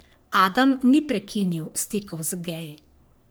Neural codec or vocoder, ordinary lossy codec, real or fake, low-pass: codec, 44.1 kHz, 3.4 kbps, Pupu-Codec; none; fake; none